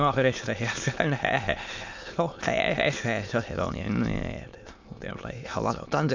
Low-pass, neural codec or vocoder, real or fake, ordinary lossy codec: 7.2 kHz; autoencoder, 22.05 kHz, a latent of 192 numbers a frame, VITS, trained on many speakers; fake; MP3, 64 kbps